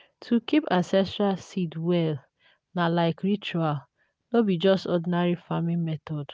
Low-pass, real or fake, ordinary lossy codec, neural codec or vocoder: none; real; none; none